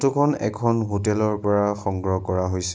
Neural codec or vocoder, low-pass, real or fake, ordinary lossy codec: none; none; real; none